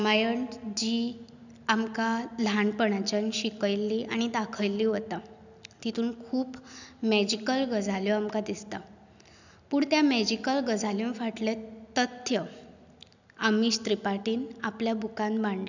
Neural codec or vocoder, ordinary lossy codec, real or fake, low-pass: none; none; real; 7.2 kHz